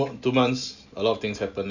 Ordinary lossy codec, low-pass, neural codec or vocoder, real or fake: none; 7.2 kHz; none; real